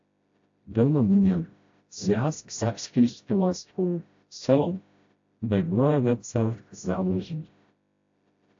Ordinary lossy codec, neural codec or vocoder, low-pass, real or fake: AAC, 48 kbps; codec, 16 kHz, 0.5 kbps, FreqCodec, smaller model; 7.2 kHz; fake